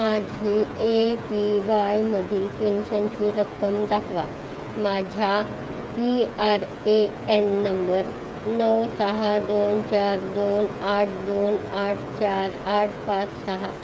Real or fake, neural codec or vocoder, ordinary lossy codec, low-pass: fake; codec, 16 kHz, 8 kbps, FreqCodec, smaller model; none; none